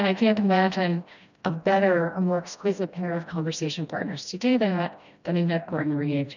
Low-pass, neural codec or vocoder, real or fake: 7.2 kHz; codec, 16 kHz, 1 kbps, FreqCodec, smaller model; fake